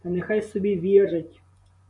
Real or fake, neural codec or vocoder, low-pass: fake; vocoder, 24 kHz, 100 mel bands, Vocos; 10.8 kHz